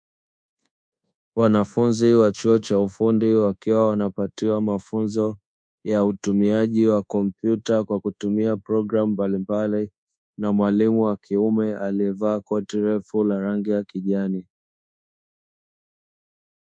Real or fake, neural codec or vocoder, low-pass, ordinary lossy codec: fake; codec, 24 kHz, 1.2 kbps, DualCodec; 9.9 kHz; MP3, 64 kbps